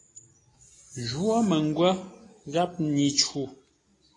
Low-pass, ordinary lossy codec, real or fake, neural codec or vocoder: 10.8 kHz; AAC, 32 kbps; real; none